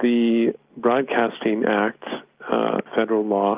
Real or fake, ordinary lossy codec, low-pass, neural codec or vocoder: real; Opus, 32 kbps; 3.6 kHz; none